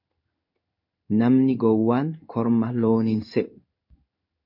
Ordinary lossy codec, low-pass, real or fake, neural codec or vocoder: MP3, 48 kbps; 5.4 kHz; fake; codec, 16 kHz in and 24 kHz out, 1 kbps, XY-Tokenizer